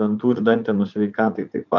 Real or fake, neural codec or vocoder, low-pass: fake; vocoder, 44.1 kHz, 80 mel bands, Vocos; 7.2 kHz